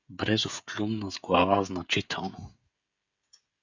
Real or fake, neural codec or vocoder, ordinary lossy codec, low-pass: fake; codec, 16 kHz, 16 kbps, FreqCodec, smaller model; Opus, 64 kbps; 7.2 kHz